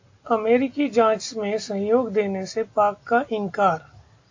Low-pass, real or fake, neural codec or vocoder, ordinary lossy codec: 7.2 kHz; real; none; AAC, 48 kbps